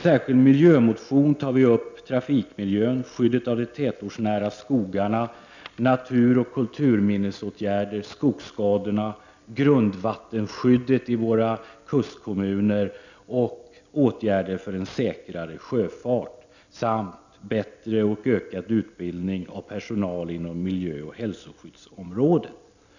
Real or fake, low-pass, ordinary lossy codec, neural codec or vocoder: real; 7.2 kHz; none; none